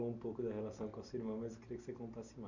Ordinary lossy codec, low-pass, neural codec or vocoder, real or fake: none; 7.2 kHz; none; real